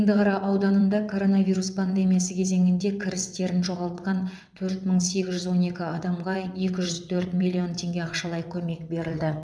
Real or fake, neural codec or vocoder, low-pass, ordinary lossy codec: fake; vocoder, 22.05 kHz, 80 mel bands, WaveNeXt; none; none